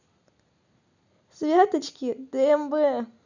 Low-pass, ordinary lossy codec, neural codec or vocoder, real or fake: 7.2 kHz; none; none; real